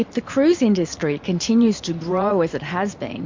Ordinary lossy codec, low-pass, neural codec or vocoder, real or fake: MP3, 48 kbps; 7.2 kHz; vocoder, 44.1 kHz, 128 mel bands every 512 samples, BigVGAN v2; fake